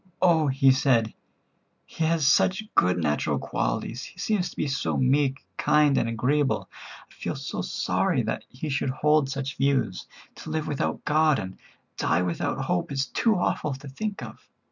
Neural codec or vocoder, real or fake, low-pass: none; real; 7.2 kHz